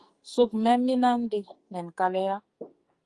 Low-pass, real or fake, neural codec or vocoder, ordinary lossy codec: 10.8 kHz; fake; codec, 44.1 kHz, 2.6 kbps, SNAC; Opus, 32 kbps